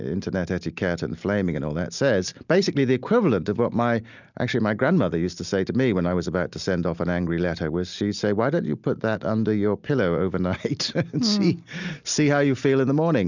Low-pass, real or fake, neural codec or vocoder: 7.2 kHz; real; none